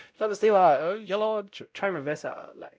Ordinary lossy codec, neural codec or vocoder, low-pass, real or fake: none; codec, 16 kHz, 0.5 kbps, X-Codec, WavLM features, trained on Multilingual LibriSpeech; none; fake